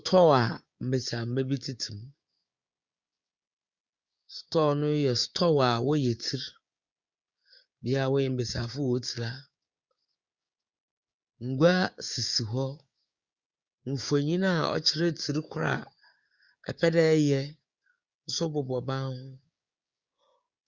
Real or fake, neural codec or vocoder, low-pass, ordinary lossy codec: fake; codec, 16 kHz, 6 kbps, DAC; 7.2 kHz; Opus, 64 kbps